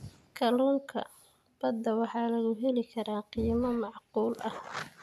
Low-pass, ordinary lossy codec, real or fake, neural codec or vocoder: 14.4 kHz; none; real; none